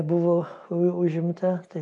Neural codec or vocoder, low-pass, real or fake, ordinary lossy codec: none; 10.8 kHz; real; AAC, 64 kbps